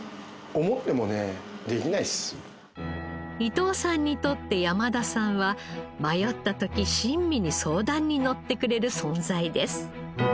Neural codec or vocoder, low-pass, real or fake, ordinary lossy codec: none; none; real; none